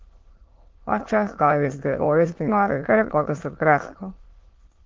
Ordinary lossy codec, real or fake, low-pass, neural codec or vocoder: Opus, 16 kbps; fake; 7.2 kHz; autoencoder, 22.05 kHz, a latent of 192 numbers a frame, VITS, trained on many speakers